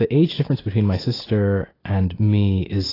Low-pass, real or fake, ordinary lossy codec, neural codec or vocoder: 5.4 kHz; real; AAC, 24 kbps; none